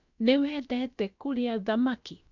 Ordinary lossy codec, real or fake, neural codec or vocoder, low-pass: none; fake; codec, 16 kHz, about 1 kbps, DyCAST, with the encoder's durations; 7.2 kHz